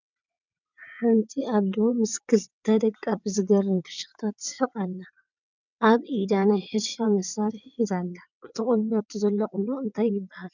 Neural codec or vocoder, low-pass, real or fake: vocoder, 22.05 kHz, 80 mel bands, WaveNeXt; 7.2 kHz; fake